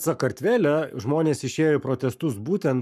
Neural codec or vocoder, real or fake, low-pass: codec, 44.1 kHz, 7.8 kbps, Pupu-Codec; fake; 14.4 kHz